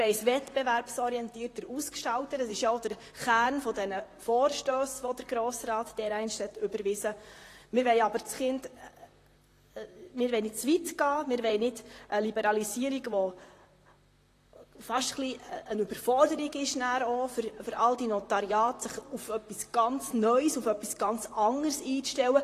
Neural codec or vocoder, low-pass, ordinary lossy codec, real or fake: vocoder, 44.1 kHz, 128 mel bands, Pupu-Vocoder; 14.4 kHz; AAC, 48 kbps; fake